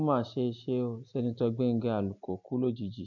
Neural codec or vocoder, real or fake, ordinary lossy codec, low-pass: none; real; none; 7.2 kHz